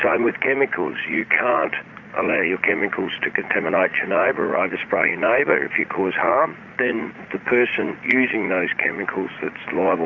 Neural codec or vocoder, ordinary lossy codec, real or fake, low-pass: vocoder, 44.1 kHz, 80 mel bands, Vocos; Opus, 64 kbps; fake; 7.2 kHz